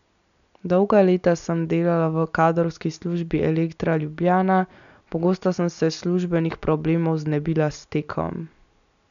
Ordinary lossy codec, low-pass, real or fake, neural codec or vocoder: none; 7.2 kHz; real; none